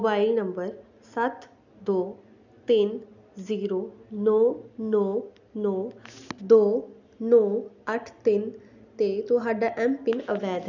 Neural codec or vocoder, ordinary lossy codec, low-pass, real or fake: none; none; 7.2 kHz; real